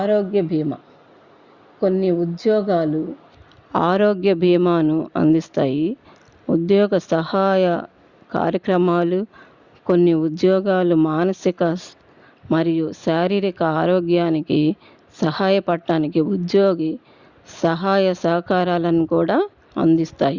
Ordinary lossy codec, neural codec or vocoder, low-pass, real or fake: Opus, 64 kbps; none; 7.2 kHz; real